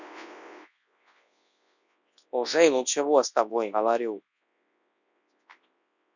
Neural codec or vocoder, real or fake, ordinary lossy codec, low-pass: codec, 24 kHz, 0.9 kbps, WavTokenizer, large speech release; fake; none; 7.2 kHz